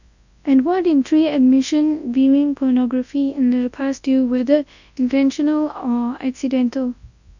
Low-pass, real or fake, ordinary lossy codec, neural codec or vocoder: 7.2 kHz; fake; none; codec, 24 kHz, 0.9 kbps, WavTokenizer, large speech release